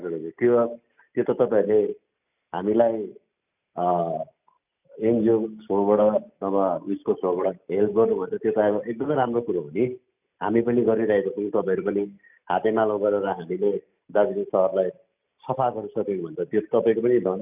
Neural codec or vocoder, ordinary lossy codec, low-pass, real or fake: none; none; 3.6 kHz; real